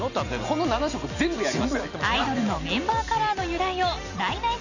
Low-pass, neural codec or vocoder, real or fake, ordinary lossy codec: 7.2 kHz; none; real; none